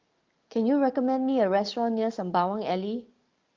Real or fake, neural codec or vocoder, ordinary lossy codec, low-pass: fake; codec, 44.1 kHz, 7.8 kbps, DAC; Opus, 32 kbps; 7.2 kHz